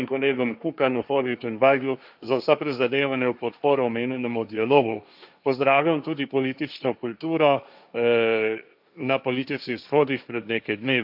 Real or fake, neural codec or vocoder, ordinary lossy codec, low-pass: fake; codec, 16 kHz, 1.1 kbps, Voila-Tokenizer; none; 5.4 kHz